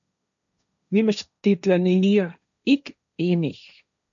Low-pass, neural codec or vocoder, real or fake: 7.2 kHz; codec, 16 kHz, 1.1 kbps, Voila-Tokenizer; fake